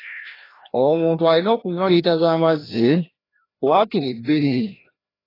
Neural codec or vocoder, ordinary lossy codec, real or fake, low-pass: codec, 16 kHz, 1 kbps, FreqCodec, larger model; AAC, 24 kbps; fake; 5.4 kHz